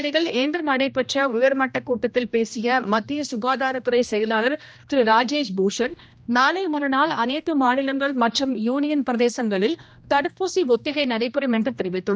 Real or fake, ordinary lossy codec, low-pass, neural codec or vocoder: fake; none; none; codec, 16 kHz, 1 kbps, X-Codec, HuBERT features, trained on general audio